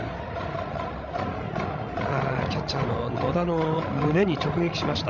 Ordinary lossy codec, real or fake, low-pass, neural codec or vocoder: none; fake; 7.2 kHz; codec, 16 kHz, 16 kbps, FreqCodec, larger model